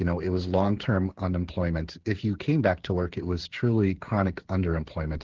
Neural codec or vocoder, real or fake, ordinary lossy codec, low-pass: codec, 16 kHz, 8 kbps, FreqCodec, smaller model; fake; Opus, 16 kbps; 7.2 kHz